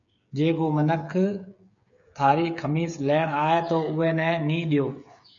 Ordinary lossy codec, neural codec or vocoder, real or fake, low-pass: AAC, 64 kbps; codec, 16 kHz, 8 kbps, FreqCodec, smaller model; fake; 7.2 kHz